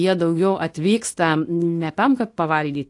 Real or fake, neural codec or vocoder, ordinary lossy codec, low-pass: fake; codec, 24 kHz, 0.9 kbps, WavTokenizer, small release; AAC, 48 kbps; 10.8 kHz